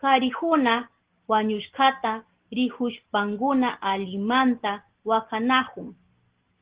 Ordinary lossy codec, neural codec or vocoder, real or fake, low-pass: Opus, 16 kbps; none; real; 3.6 kHz